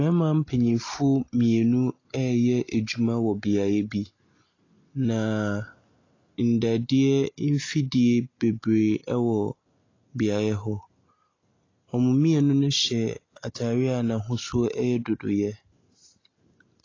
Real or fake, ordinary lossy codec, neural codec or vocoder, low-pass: real; AAC, 32 kbps; none; 7.2 kHz